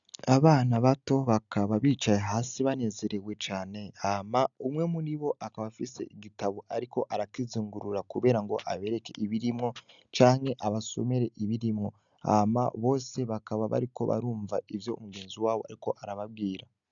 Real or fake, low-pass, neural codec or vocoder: real; 7.2 kHz; none